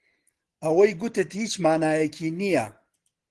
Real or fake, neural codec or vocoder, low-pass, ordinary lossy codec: real; none; 10.8 kHz; Opus, 16 kbps